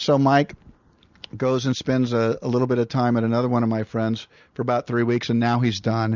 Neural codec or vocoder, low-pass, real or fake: none; 7.2 kHz; real